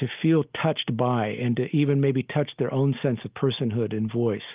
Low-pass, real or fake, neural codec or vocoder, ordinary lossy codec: 3.6 kHz; real; none; Opus, 32 kbps